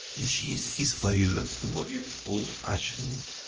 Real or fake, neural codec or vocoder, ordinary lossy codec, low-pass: fake; codec, 16 kHz, 1 kbps, X-Codec, HuBERT features, trained on LibriSpeech; Opus, 24 kbps; 7.2 kHz